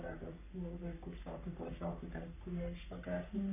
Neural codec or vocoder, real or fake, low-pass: codec, 44.1 kHz, 3.4 kbps, Pupu-Codec; fake; 3.6 kHz